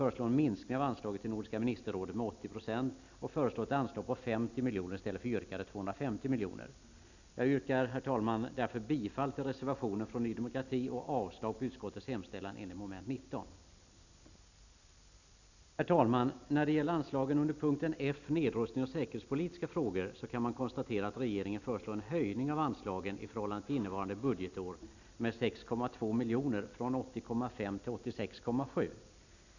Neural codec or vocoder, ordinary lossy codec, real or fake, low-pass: none; none; real; 7.2 kHz